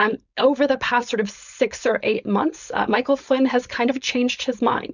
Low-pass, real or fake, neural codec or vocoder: 7.2 kHz; fake; codec, 16 kHz, 4.8 kbps, FACodec